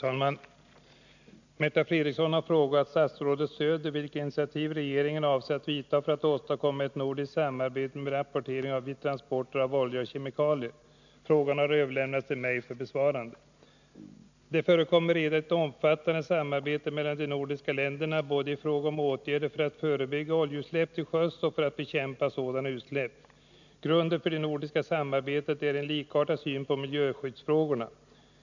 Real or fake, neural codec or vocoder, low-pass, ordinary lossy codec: real; none; 7.2 kHz; none